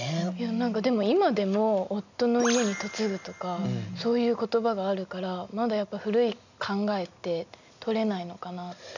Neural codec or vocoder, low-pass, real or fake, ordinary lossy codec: none; 7.2 kHz; real; none